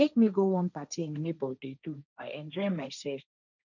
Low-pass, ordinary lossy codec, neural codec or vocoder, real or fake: 7.2 kHz; none; codec, 16 kHz, 1.1 kbps, Voila-Tokenizer; fake